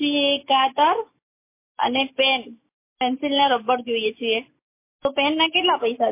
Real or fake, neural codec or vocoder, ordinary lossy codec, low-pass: real; none; MP3, 24 kbps; 3.6 kHz